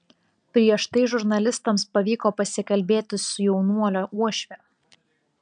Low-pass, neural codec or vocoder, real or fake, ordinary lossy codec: 9.9 kHz; none; real; MP3, 96 kbps